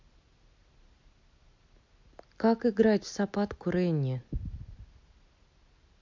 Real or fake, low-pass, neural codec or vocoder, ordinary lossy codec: real; 7.2 kHz; none; MP3, 48 kbps